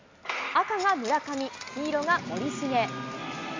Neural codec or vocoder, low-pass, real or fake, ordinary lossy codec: none; 7.2 kHz; real; MP3, 64 kbps